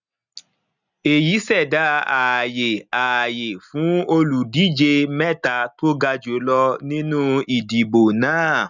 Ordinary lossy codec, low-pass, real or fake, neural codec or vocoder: none; 7.2 kHz; real; none